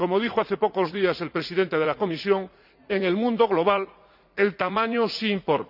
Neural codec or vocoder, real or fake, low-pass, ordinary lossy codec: vocoder, 44.1 kHz, 80 mel bands, Vocos; fake; 5.4 kHz; none